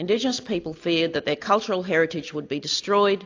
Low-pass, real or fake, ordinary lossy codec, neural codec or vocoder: 7.2 kHz; real; AAC, 48 kbps; none